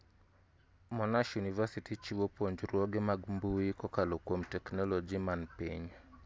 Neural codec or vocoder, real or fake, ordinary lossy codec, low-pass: none; real; none; none